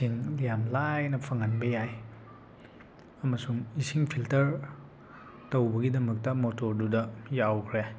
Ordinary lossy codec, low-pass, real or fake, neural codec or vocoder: none; none; real; none